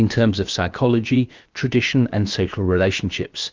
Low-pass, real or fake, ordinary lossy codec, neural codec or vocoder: 7.2 kHz; fake; Opus, 32 kbps; codec, 16 kHz, about 1 kbps, DyCAST, with the encoder's durations